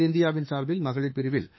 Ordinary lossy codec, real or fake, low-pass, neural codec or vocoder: MP3, 24 kbps; fake; 7.2 kHz; autoencoder, 48 kHz, 32 numbers a frame, DAC-VAE, trained on Japanese speech